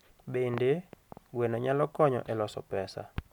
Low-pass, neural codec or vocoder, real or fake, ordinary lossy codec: 19.8 kHz; none; real; none